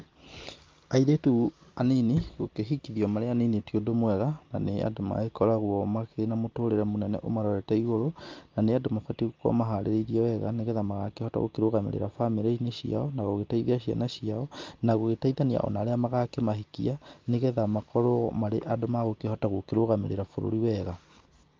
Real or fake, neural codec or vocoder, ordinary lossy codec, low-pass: real; none; Opus, 24 kbps; 7.2 kHz